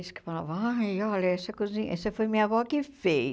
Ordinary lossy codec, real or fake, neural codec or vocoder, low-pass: none; real; none; none